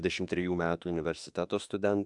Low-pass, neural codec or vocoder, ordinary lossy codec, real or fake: 10.8 kHz; autoencoder, 48 kHz, 32 numbers a frame, DAC-VAE, trained on Japanese speech; AAC, 64 kbps; fake